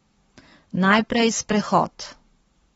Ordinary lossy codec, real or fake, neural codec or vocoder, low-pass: AAC, 24 kbps; fake; codec, 44.1 kHz, 7.8 kbps, Pupu-Codec; 19.8 kHz